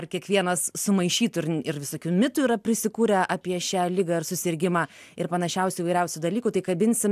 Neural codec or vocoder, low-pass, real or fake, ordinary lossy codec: none; 14.4 kHz; real; AAC, 96 kbps